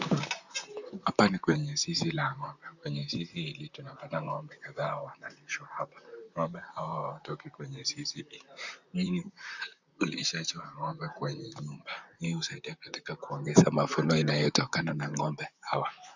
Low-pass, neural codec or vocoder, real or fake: 7.2 kHz; vocoder, 44.1 kHz, 128 mel bands, Pupu-Vocoder; fake